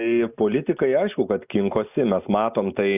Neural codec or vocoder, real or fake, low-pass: none; real; 3.6 kHz